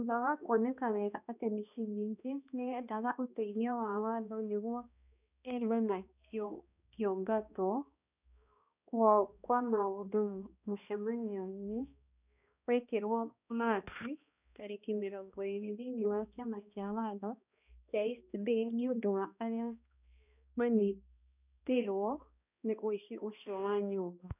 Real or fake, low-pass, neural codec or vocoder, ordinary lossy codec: fake; 3.6 kHz; codec, 16 kHz, 1 kbps, X-Codec, HuBERT features, trained on balanced general audio; none